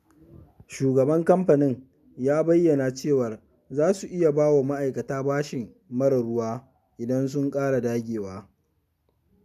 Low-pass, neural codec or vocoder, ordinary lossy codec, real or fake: 14.4 kHz; none; none; real